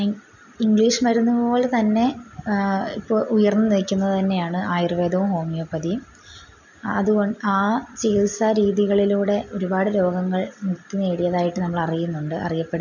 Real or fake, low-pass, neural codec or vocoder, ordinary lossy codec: real; 7.2 kHz; none; none